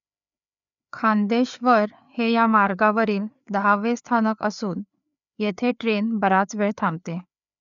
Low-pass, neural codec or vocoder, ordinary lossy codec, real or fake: 7.2 kHz; codec, 16 kHz, 4 kbps, FreqCodec, larger model; none; fake